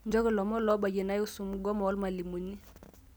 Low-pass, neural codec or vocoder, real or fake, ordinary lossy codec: none; none; real; none